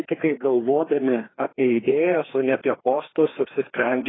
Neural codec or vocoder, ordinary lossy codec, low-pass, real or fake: codec, 16 kHz, 2 kbps, FreqCodec, larger model; AAC, 16 kbps; 7.2 kHz; fake